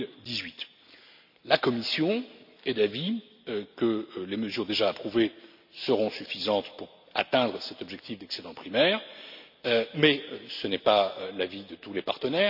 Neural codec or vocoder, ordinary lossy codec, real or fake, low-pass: none; none; real; 5.4 kHz